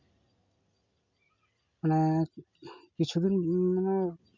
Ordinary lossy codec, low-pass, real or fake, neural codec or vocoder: MP3, 64 kbps; 7.2 kHz; real; none